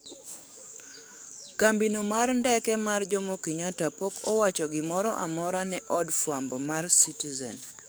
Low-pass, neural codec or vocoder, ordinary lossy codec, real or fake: none; codec, 44.1 kHz, 7.8 kbps, DAC; none; fake